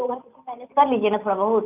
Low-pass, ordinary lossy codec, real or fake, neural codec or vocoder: 3.6 kHz; none; real; none